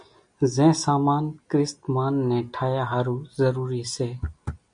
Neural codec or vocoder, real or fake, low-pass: none; real; 9.9 kHz